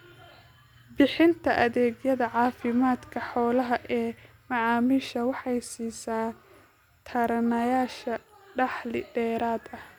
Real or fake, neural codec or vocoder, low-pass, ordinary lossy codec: real; none; 19.8 kHz; none